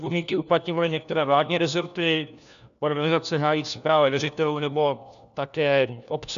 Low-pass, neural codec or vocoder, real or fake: 7.2 kHz; codec, 16 kHz, 1 kbps, FunCodec, trained on LibriTTS, 50 frames a second; fake